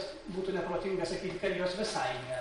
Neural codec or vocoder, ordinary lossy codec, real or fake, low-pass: vocoder, 44.1 kHz, 128 mel bands every 512 samples, BigVGAN v2; MP3, 48 kbps; fake; 19.8 kHz